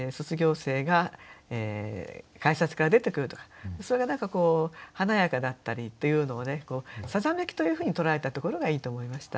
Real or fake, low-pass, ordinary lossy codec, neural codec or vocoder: real; none; none; none